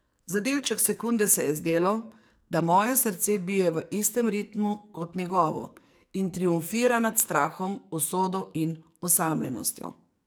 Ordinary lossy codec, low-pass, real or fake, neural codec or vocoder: none; none; fake; codec, 44.1 kHz, 2.6 kbps, SNAC